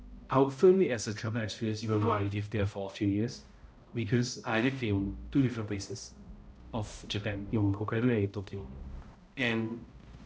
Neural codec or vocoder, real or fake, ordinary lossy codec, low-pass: codec, 16 kHz, 0.5 kbps, X-Codec, HuBERT features, trained on balanced general audio; fake; none; none